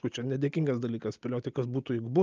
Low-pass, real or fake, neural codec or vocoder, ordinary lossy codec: 7.2 kHz; real; none; Opus, 24 kbps